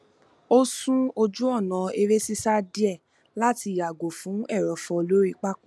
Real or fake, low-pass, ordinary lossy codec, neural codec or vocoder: real; none; none; none